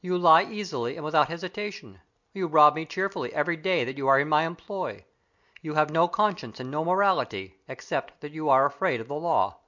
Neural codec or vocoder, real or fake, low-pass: none; real; 7.2 kHz